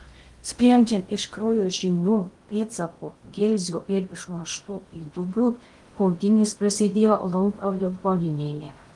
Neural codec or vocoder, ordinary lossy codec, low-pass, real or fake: codec, 16 kHz in and 24 kHz out, 0.6 kbps, FocalCodec, streaming, 2048 codes; Opus, 24 kbps; 10.8 kHz; fake